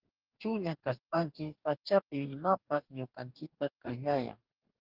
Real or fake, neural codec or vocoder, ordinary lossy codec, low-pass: fake; codec, 44.1 kHz, 2.6 kbps, DAC; Opus, 24 kbps; 5.4 kHz